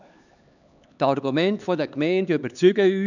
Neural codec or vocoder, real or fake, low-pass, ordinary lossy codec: codec, 16 kHz, 4 kbps, X-Codec, HuBERT features, trained on LibriSpeech; fake; 7.2 kHz; none